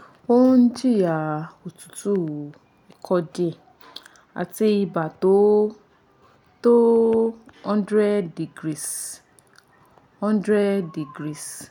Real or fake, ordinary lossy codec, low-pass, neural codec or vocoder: real; none; 19.8 kHz; none